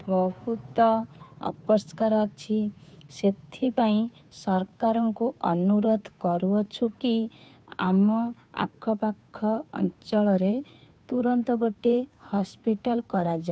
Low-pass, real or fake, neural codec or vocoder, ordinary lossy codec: none; fake; codec, 16 kHz, 2 kbps, FunCodec, trained on Chinese and English, 25 frames a second; none